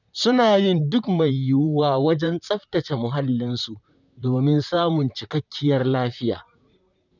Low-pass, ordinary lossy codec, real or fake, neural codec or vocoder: 7.2 kHz; none; fake; vocoder, 44.1 kHz, 128 mel bands, Pupu-Vocoder